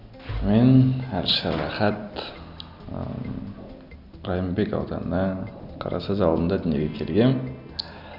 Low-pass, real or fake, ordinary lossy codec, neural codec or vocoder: 5.4 kHz; real; none; none